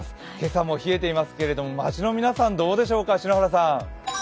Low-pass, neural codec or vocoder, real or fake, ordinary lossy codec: none; none; real; none